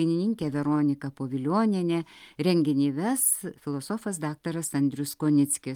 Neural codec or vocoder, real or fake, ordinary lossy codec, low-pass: none; real; Opus, 32 kbps; 19.8 kHz